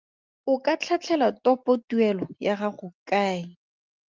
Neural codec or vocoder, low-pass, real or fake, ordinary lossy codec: none; 7.2 kHz; real; Opus, 32 kbps